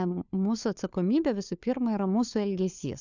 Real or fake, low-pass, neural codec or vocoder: fake; 7.2 kHz; codec, 16 kHz, 4 kbps, FunCodec, trained on LibriTTS, 50 frames a second